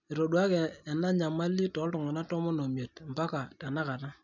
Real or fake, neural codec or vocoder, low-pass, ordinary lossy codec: real; none; 7.2 kHz; none